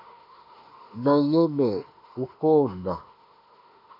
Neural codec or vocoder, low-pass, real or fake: autoencoder, 48 kHz, 32 numbers a frame, DAC-VAE, trained on Japanese speech; 5.4 kHz; fake